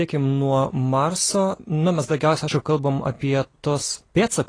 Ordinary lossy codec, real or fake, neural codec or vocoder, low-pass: AAC, 32 kbps; real; none; 9.9 kHz